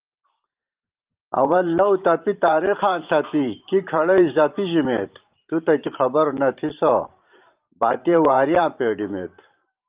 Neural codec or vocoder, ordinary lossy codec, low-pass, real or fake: vocoder, 44.1 kHz, 128 mel bands every 512 samples, BigVGAN v2; Opus, 32 kbps; 3.6 kHz; fake